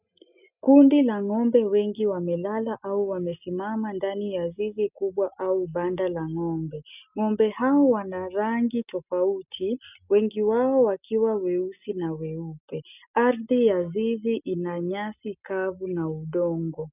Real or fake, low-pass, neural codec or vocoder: real; 3.6 kHz; none